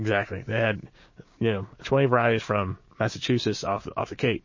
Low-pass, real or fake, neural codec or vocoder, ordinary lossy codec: 7.2 kHz; fake; codec, 16 kHz, 6 kbps, DAC; MP3, 32 kbps